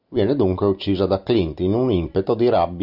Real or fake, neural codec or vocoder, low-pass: real; none; 5.4 kHz